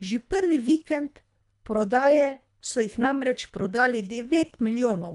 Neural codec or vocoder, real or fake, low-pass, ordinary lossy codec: codec, 24 kHz, 1.5 kbps, HILCodec; fake; 10.8 kHz; none